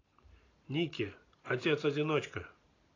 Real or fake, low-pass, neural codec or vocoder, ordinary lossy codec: real; 7.2 kHz; none; AAC, 32 kbps